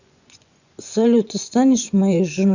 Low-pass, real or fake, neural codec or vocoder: 7.2 kHz; fake; vocoder, 24 kHz, 100 mel bands, Vocos